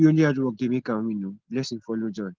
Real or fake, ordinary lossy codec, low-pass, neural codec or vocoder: real; Opus, 16 kbps; 7.2 kHz; none